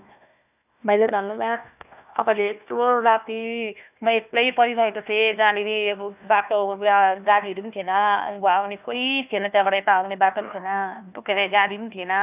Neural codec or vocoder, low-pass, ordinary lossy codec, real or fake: codec, 16 kHz, 1 kbps, FunCodec, trained on Chinese and English, 50 frames a second; 3.6 kHz; none; fake